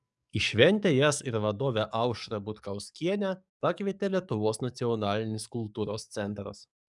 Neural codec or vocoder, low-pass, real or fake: codec, 44.1 kHz, 7.8 kbps, DAC; 10.8 kHz; fake